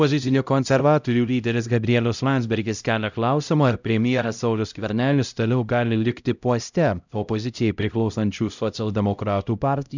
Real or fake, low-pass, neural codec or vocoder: fake; 7.2 kHz; codec, 16 kHz, 0.5 kbps, X-Codec, HuBERT features, trained on LibriSpeech